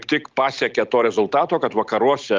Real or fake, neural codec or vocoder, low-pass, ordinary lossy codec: real; none; 7.2 kHz; Opus, 24 kbps